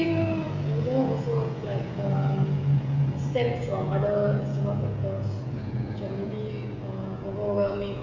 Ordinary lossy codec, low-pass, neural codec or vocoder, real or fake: AAC, 48 kbps; 7.2 kHz; codec, 16 kHz, 16 kbps, FreqCodec, smaller model; fake